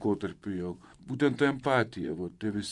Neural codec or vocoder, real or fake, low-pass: vocoder, 24 kHz, 100 mel bands, Vocos; fake; 10.8 kHz